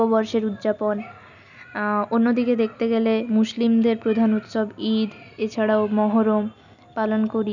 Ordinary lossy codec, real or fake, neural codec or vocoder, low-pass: none; real; none; 7.2 kHz